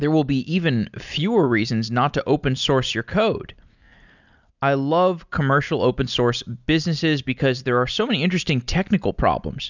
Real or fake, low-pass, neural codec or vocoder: real; 7.2 kHz; none